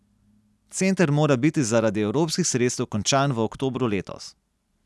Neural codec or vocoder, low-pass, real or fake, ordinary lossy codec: none; none; real; none